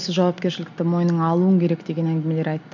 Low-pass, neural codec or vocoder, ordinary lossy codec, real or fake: 7.2 kHz; none; none; real